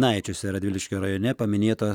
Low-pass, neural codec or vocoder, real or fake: 19.8 kHz; none; real